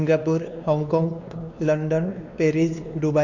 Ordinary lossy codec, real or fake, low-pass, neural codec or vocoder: none; fake; 7.2 kHz; codec, 16 kHz, 2 kbps, X-Codec, WavLM features, trained on Multilingual LibriSpeech